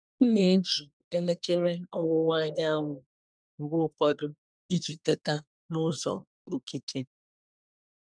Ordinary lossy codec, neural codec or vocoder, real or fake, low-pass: none; codec, 24 kHz, 1 kbps, SNAC; fake; 9.9 kHz